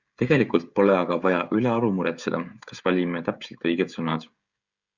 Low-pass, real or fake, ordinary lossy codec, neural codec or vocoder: 7.2 kHz; fake; Opus, 64 kbps; codec, 16 kHz, 16 kbps, FreqCodec, smaller model